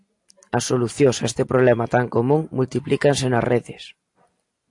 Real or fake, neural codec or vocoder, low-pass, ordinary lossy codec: real; none; 10.8 kHz; AAC, 64 kbps